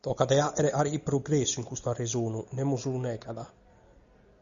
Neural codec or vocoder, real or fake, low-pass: none; real; 7.2 kHz